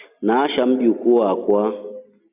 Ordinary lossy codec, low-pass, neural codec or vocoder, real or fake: AAC, 24 kbps; 3.6 kHz; none; real